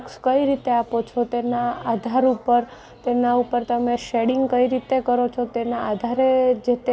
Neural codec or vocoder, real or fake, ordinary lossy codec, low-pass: none; real; none; none